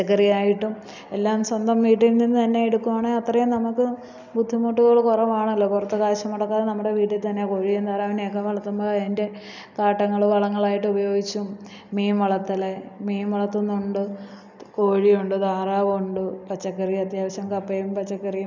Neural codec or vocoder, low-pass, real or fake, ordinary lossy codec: none; 7.2 kHz; real; none